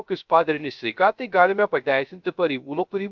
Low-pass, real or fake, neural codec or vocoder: 7.2 kHz; fake; codec, 16 kHz, 0.3 kbps, FocalCodec